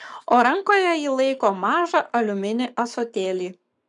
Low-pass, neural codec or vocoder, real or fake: 10.8 kHz; codec, 44.1 kHz, 7.8 kbps, Pupu-Codec; fake